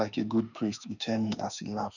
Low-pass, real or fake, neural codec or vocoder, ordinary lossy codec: 7.2 kHz; fake; autoencoder, 48 kHz, 32 numbers a frame, DAC-VAE, trained on Japanese speech; none